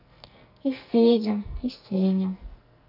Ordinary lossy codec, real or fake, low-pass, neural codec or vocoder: none; fake; 5.4 kHz; codec, 44.1 kHz, 2.6 kbps, SNAC